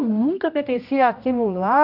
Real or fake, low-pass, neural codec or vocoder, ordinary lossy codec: fake; 5.4 kHz; codec, 16 kHz, 1 kbps, X-Codec, HuBERT features, trained on general audio; none